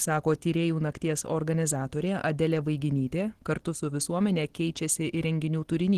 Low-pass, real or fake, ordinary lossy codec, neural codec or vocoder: 14.4 kHz; real; Opus, 16 kbps; none